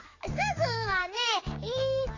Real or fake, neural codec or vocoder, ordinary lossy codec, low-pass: fake; codec, 16 kHz, 4 kbps, X-Codec, HuBERT features, trained on general audio; AAC, 32 kbps; 7.2 kHz